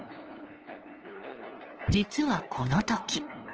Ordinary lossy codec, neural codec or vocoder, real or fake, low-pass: Opus, 16 kbps; codec, 16 kHz, 2 kbps, FunCodec, trained on LibriTTS, 25 frames a second; fake; 7.2 kHz